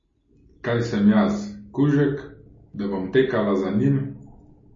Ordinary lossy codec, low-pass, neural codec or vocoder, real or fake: MP3, 32 kbps; 7.2 kHz; none; real